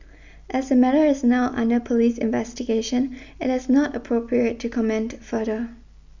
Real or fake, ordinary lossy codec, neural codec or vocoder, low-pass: real; none; none; 7.2 kHz